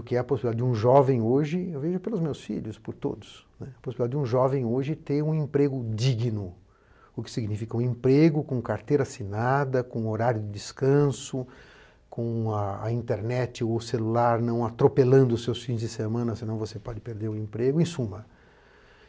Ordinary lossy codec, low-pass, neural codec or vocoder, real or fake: none; none; none; real